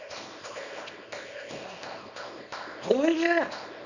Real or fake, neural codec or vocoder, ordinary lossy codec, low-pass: fake; codec, 24 kHz, 0.9 kbps, WavTokenizer, small release; none; 7.2 kHz